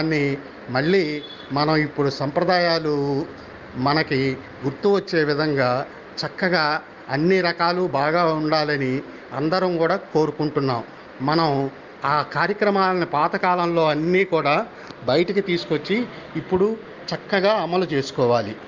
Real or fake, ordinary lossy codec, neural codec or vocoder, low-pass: real; Opus, 24 kbps; none; 7.2 kHz